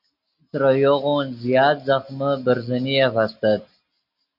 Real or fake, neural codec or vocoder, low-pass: real; none; 5.4 kHz